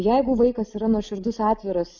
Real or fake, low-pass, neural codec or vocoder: real; 7.2 kHz; none